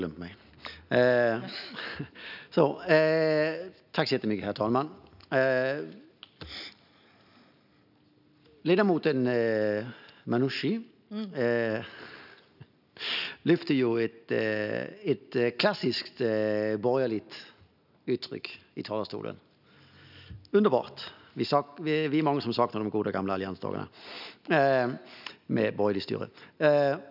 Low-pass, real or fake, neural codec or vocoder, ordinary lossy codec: 5.4 kHz; real; none; none